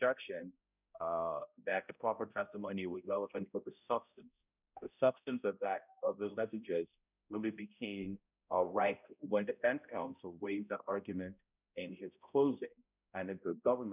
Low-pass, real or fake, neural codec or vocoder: 3.6 kHz; fake; codec, 16 kHz, 0.5 kbps, X-Codec, HuBERT features, trained on balanced general audio